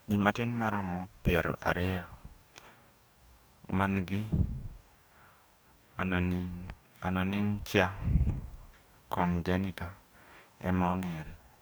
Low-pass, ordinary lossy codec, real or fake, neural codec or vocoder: none; none; fake; codec, 44.1 kHz, 2.6 kbps, DAC